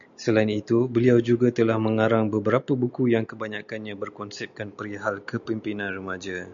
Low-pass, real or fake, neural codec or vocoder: 7.2 kHz; real; none